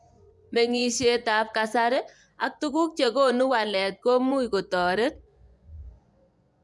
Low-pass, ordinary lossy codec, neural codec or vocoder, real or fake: none; none; vocoder, 24 kHz, 100 mel bands, Vocos; fake